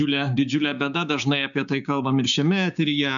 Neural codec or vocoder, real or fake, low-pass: codec, 16 kHz, 6 kbps, DAC; fake; 7.2 kHz